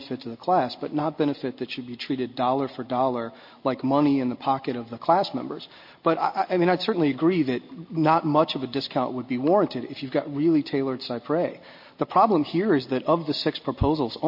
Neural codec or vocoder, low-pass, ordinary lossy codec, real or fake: none; 5.4 kHz; MP3, 32 kbps; real